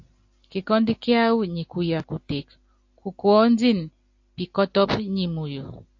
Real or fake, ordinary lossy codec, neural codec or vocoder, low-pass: real; AAC, 48 kbps; none; 7.2 kHz